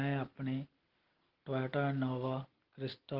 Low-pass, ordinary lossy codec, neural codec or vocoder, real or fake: 5.4 kHz; Opus, 16 kbps; none; real